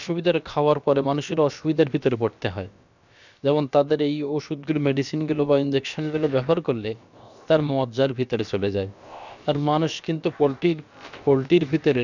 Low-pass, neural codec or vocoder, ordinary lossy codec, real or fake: 7.2 kHz; codec, 16 kHz, about 1 kbps, DyCAST, with the encoder's durations; none; fake